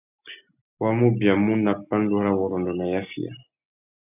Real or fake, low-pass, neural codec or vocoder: real; 3.6 kHz; none